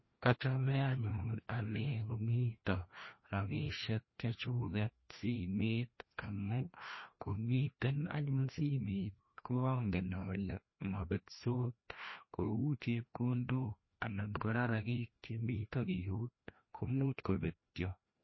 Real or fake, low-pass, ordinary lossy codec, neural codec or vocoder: fake; 7.2 kHz; MP3, 24 kbps; codec, 16 kHz, 1 kbps, FreqCodec, larger model